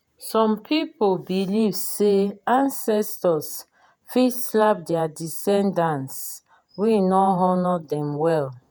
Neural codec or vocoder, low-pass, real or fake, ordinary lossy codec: vocoder, 48 kHz, 128 mel bands, Vocos; none; fake; none